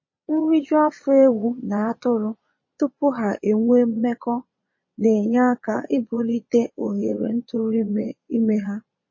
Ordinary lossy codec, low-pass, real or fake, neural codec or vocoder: MP3, 32 kbps; 7.2 kHz; fake; vocoder, 22.05 kHz, 80 mel bands, Vocos